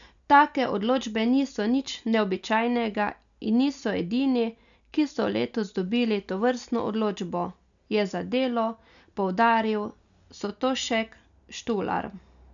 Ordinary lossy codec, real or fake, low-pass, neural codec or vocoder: none; real; 7.2 kHz; none